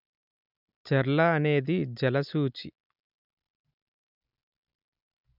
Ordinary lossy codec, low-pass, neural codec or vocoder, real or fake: none; 5.4 kHz; none; real